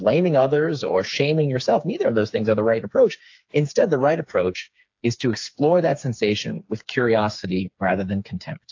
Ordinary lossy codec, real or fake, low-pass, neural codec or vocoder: AAC, 48 kbps; fake; 7.2 kHz; codec, 16 kHz, 4 kbps, FreqCodec, smaller model